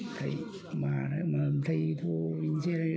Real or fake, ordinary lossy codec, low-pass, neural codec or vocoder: real; none; none; none